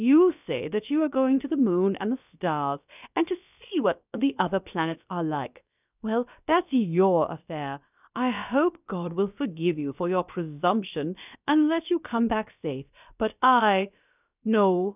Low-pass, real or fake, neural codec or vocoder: 3.6 kHz; fake; codec, 16 kHz, about 1 kbps, DyCAST, with the encoder's durations